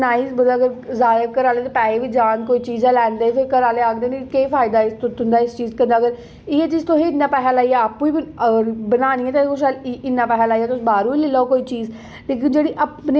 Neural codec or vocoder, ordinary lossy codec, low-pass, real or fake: none; none; none; real